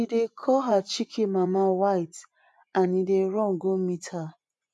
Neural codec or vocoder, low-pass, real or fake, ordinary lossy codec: vocoder, 24 kHz, 100 mel bands, Vocos; none; fake; none